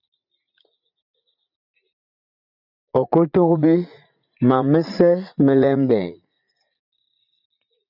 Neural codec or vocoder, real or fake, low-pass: vocoder, 44.1 kHz, 80 mel bands, Vocos; fake; 5.4 kHz